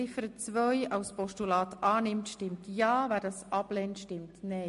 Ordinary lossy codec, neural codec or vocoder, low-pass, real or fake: MP3, 48 kbps; none; 10.8 kHz; real